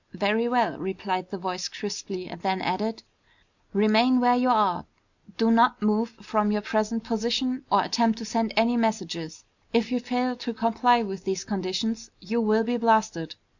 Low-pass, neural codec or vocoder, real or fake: 7.2 kHz; none; real